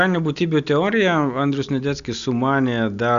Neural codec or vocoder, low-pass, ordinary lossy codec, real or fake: none; 7.2 kHz; AAC, 96 kbps; real